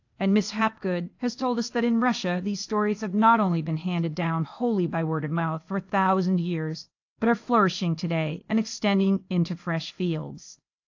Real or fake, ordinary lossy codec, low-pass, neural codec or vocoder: fake; AAC, 48 kbps; 7.2 kHz; codec, 16 kHz, 0.8 kbps, ZipCodec